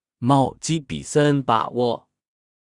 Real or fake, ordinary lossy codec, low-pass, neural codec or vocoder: fake; Opus, 64 kbps; 10.8 kHz; codec, 16 kHz in and 24 kHz out, 0.4 kbps, LongCat-Audio-Codec, two codebook decoder